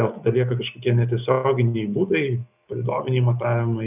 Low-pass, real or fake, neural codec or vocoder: 3.6 kHz; real; none